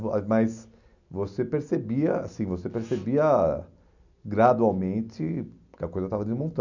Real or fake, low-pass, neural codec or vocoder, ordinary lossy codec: real; 7.2 kHz; none; none